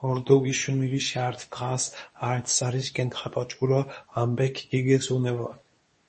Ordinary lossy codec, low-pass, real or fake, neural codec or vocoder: MP3, 32 kbps; 10.8 kHz; fake; codec, 24 kHz, 0.9 kbps, WavTokenizer, medium speech release version 2